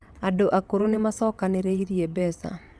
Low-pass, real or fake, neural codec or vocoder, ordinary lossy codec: none; fake; vocoder, 22.05 kHz, 80 mel bands, WaveNeXt; none